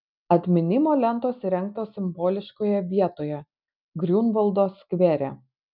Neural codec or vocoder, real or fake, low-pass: none; real; 5.4 kHz